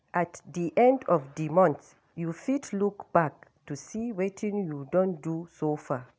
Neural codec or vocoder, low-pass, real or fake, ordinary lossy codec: none; none; real; none